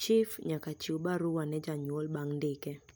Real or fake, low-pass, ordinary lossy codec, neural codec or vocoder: real; none; none; none